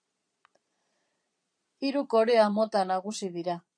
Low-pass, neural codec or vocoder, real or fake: 9.9 kHz; vocoder, 22.05 kHz, 80 mel bands, Vocos; fake